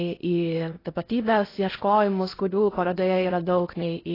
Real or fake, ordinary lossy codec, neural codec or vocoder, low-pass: fake; AAC, 24 kbps; codec, 16 kHz in and 24 kHz out, 0.8 kbps, FocalCodec, streaming, 65536 codes; 5.4 kHz